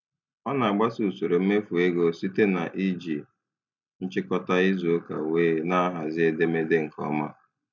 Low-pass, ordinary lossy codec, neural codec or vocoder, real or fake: 7.2 kHz; none; none; real